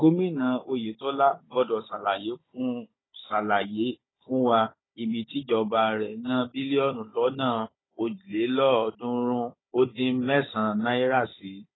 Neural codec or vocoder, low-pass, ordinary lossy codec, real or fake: codec, 16 kHz, 16 kbps, FunCodec, trained on Chinese and English, 50 frames a second; 7.2 kHz; AAC, 16 kbps; fake